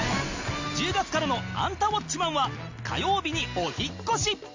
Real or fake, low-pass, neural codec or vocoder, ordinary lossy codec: real; 7.2 kHz; none; MP3, 64 kbps